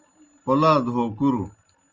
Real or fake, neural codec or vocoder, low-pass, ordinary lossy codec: real; none; 7.2 kHz; Opus, 32 kbps